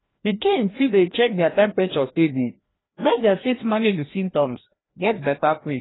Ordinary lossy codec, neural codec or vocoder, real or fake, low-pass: AAC, 16 kbps; codec, 16 kHz, 1 kbps, FreqCodec, larger model; fake; 7.2 kHz